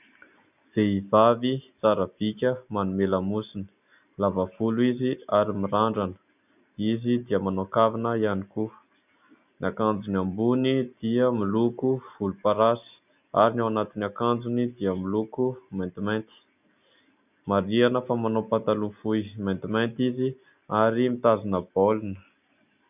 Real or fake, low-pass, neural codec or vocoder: real; 3.6 kHz; none